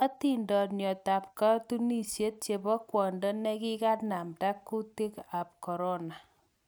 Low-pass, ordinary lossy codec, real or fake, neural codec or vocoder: none; none; real; none